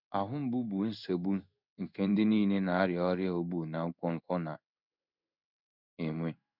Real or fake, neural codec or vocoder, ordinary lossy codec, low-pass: fake; codec, 16 kHz in and 24 kHz out, 1 kbps, XY-Tokenizer; none; 5.4 kHz